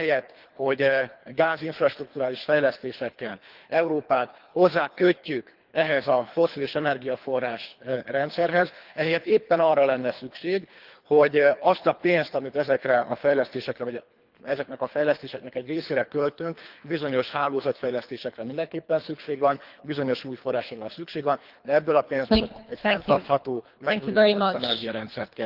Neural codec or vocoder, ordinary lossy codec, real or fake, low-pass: codec, 24 kHz, 3 kbps, HILCodec; Opus, 32 kbps; fake; 5.4 kHz